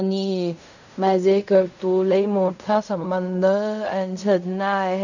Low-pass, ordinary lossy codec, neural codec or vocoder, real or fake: 7.2 kHz; none; codec, 16 kHz in and 24 kHz out, 0.4 kbps, LongCat-Audio-Codec, fine tuned four codebook decoder; fake